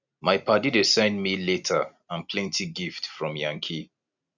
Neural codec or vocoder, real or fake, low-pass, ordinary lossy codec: none; real; 7.2 kHz; none